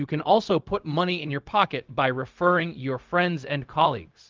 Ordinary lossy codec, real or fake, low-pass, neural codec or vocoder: Opus, 24 kbps; fake; 7.2 kHz; codec, 16 kHz, 0.4 kbps, LongCat-Audio-Codec